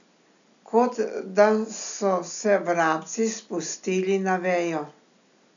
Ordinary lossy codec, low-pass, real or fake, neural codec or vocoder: none; 7.2 kHz; real; none